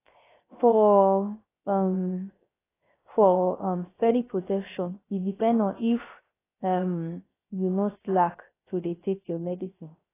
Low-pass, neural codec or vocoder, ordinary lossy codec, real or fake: 3.6 kHz; codec, 16 kHz, 0.3 kbps, FocalCodec; AAC, 16 kbps; fake